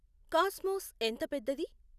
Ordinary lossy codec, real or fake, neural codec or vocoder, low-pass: none; real; none; 14.4 kHz